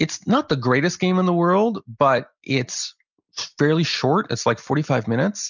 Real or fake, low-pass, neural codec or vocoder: real; 7.2 kHz; none